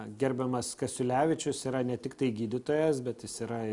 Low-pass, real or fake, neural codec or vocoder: 10.8 kHz; real; none